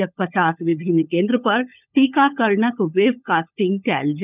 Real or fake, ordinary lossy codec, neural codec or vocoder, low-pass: fake; none; codec, 16 kHz, 16 kbps, FunCodec, trained on LibriTTS, 50 frames a second; 3.6 kHz